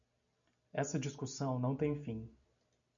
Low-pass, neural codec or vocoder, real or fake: 7.2 kHz; none; real